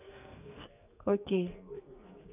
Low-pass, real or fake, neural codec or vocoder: 3.6 kHz; fake; codec, 44.1 kHz, 2.6 kbps, SNAC